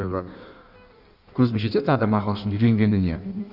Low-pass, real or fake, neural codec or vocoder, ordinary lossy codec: 5.4 kHz; fake; codec, 16 kHz in and 24 kHz out, 1.1 kbps, FireRedTTS-2 codec; none